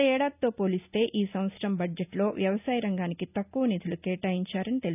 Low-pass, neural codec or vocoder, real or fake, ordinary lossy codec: 3.6 kHz; none; real; none